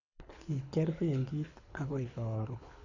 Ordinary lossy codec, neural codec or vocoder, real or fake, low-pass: none; codec, 24 kHz, 6 kbps, HILCodec; fake; 7.2 kHz